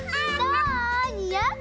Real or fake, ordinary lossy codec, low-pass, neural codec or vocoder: real; none; none; none